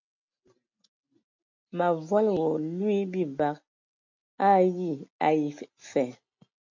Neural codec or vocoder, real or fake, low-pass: none; real; 7.2 kHz